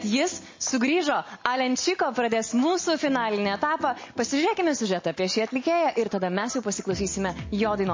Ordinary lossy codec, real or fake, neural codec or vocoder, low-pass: MP3, 32 kbps; real; none; 7.2 kHz